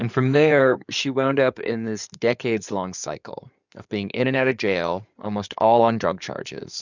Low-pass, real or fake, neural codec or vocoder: 7.2 kHz; fake; codec, 16 kHz in and 24 kHz out, 2.2 kbps, FireRedTTS-2 codec